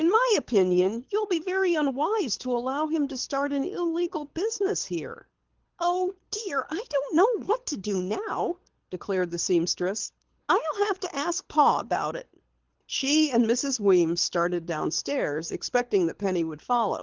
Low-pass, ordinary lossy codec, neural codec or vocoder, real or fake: 7.2 kHz; Opus, 16 kbps; codec, 24 kHz, 6 kbps, HILCodec; fake